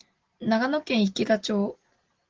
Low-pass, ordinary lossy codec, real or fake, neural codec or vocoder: 7.2 kHz; Opus, 16 kbps; real; none